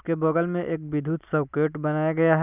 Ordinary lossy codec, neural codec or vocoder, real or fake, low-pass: none; none; real; 3.6 kHz